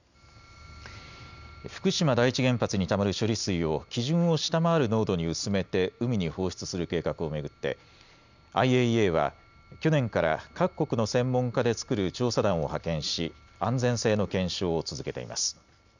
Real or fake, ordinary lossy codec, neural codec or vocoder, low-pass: real; none; none; 7.2 kHz